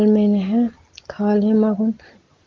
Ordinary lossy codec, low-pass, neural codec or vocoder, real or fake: Opus, 16 kbps; 7.2 kHz; none; real